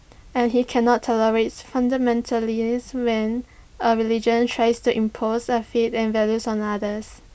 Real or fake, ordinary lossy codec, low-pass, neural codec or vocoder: real; none; none; none